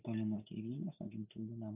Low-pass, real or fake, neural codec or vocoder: 3.6 kHz; real; none